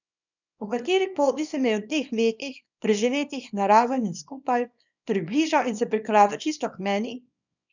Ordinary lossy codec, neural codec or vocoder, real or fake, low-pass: none; codec, 24 kHz, 0.9 kbps, WavTokenizer, small release; fake; 7.2 kHz